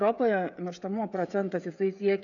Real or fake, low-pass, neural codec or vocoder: fake; 7.2 kHz; codec, 16 kHz, 16 kbps, FreqCodec, smaller model